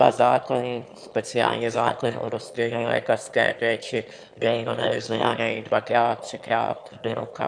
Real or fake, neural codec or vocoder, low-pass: fake; autoencoder, 22.05 kHz, a latent of 192 numbers a frame, VITS, trained on one speaker; 9.9 kHz